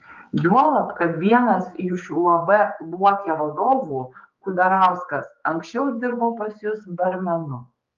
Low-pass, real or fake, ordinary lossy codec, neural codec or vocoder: 7.2 kHz; fake; Opus, 32 kbps; codec, 16 kHz, 4 kbps, X-Codec, HuBERT features, trained on general audio